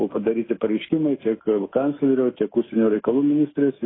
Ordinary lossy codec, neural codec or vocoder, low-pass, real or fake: AAC, 16 kbps; autoencoder, 48 kHz, 128 numbers a frame, DAC-VAE, trained on Japanese speech; 7.2 kHz; fake